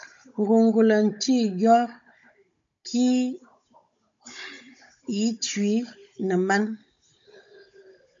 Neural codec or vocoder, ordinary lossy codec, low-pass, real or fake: codec, 16 kHz, 16 kbps, FunCodec, trained on Chinese and English, 50 frames a second; AAC, 64 kbps; 7.2 kHz; fake